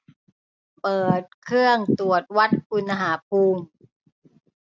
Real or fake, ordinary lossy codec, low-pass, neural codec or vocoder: real; none; none; none